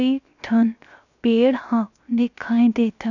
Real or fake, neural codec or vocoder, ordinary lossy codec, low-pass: fake; codec, 16 kHz, 0.7 kbps, FocalCodec; none; 7.2 kHz